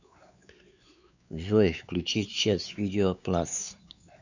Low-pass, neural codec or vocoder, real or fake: 7.2 kHz; codec, 16 kHz, 4 kbps, X-Codec, WavLM features, trained on Multilingual LibriSpeech; fake